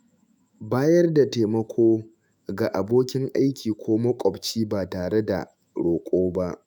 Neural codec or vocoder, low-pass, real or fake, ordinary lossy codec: autoencoder, 48 kHz, 128 numbers a frame, DAC-VAE, trained on Japanese speech; 19.8 kHz; fake; none